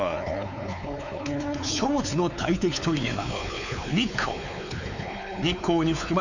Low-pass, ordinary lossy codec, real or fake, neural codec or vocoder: 7.2 kHz; none; fake; codec, 16 kHz, 4 kbps, X-Codec, WavLM features, trained on Multilingual LibriSpeech